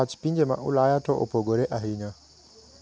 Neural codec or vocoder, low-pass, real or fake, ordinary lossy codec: none; none; real; none